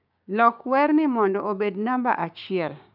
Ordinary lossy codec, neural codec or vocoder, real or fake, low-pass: none; codec, 16 kHz, 6 kbps, DAC; fake; 5.4 kHz